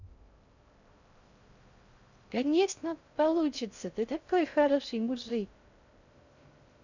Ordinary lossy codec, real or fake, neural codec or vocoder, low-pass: none; fake; codec, 16 kHz in and 24 kHz out, 0.6 kbps, FocalCodec, streaming, 2048 codes; 7.2 kHz